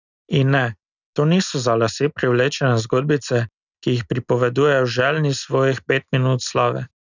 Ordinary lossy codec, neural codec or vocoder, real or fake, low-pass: none; none; real; 7.2 kHz